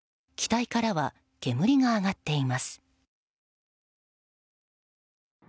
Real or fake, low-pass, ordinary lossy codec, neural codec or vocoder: real; none; none; none